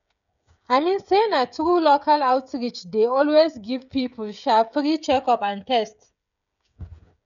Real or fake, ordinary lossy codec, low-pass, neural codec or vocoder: fake; none; 7.2 kHz; codec, 16 kHz, 16 kbps, FreqCodec, smaller model